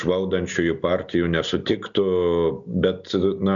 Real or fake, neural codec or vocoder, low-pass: real; none; 7.2 kHz